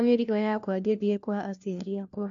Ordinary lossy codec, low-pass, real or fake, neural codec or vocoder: AAC, 64 kbps; 7.2 kHz; fake; codec, 16 kHz, 1 kbps, FunCodec, trained on LibriTTS, 50 frames a second